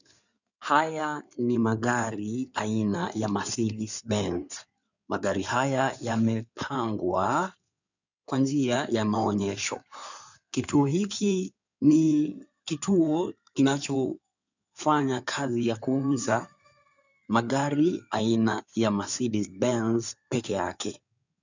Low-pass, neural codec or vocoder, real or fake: 7.2 kHz; codec, 16 kHz in and 24 kHz out, 2.2 kbps, FireRedTTS-2 codec; fake